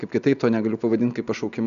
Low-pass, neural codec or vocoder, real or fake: 7.2 kHz; none; real